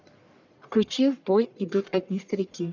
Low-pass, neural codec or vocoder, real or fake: 7.2 kHz; codec, 44.1 kHz, 1.7 kbps, Pupu-Codec; fake